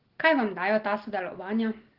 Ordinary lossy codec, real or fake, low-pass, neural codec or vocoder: Opus, 16 kbps; real; 5.4 kHz; none